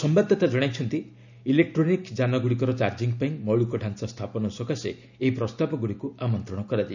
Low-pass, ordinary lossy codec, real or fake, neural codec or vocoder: 7.2 kHz; none; real; none